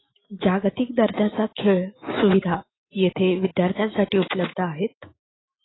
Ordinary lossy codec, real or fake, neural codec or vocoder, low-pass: AAC, 16 kbps; fake; autoencoder, 48 kHz, 128 numbers a frame, DAC-VAE, trained on Japanese speech; 7.2 kHz